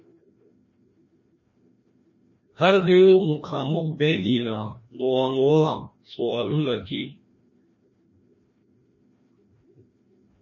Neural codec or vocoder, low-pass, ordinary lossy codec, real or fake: codec, 16 kHz, 1 kbps, FreqCodec, larger model; 7.2 kHz; MP3, 32 kbps; fake